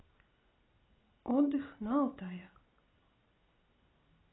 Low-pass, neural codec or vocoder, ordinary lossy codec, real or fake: 7.2 kHz; none; AAC, 16 kbps; real